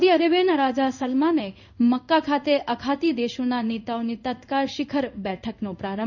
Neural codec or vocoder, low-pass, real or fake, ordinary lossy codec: codec, 16 kHz in and 24 kHz out, 1 kbps, XY-Tokenizer; 7.2 kHz; fake; none